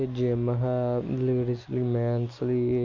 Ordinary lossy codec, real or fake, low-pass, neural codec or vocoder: MP3, 64 kbps; real; 7.2 kHz; none